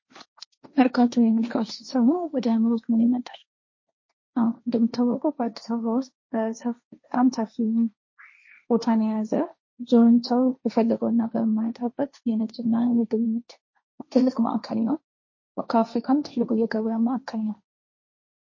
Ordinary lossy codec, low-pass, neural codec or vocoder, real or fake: MP3, 32 kbps; 7.2 kHz; codec, 16 kHz, 1.1 kbps, Voila-Tokenizer; fake